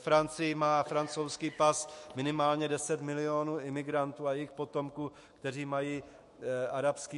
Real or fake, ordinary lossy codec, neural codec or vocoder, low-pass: fake; MP3, 48 kbps; autoencoder, 48 kHz, 128 numbers a frame, DAC-VAE, trained on Japanese speech; 14.4 kHz